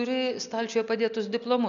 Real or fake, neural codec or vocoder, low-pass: real; none; 7.2 kHz